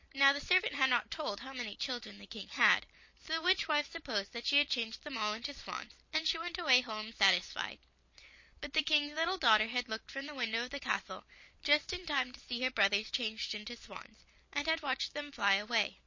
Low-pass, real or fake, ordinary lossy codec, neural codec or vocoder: 7.2 kHz; real; MP3, 32 kbps; none